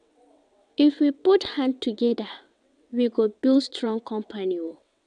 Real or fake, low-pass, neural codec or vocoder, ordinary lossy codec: fake; 9.9 kHz; vocoder, 22.05 kHz, 80 mel bands, WaveNeXt; none